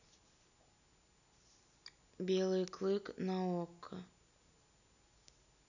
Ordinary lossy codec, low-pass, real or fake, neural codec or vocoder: none; 7.2 kHz; real; none